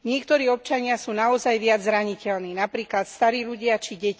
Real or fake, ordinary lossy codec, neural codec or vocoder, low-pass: real; none; none; none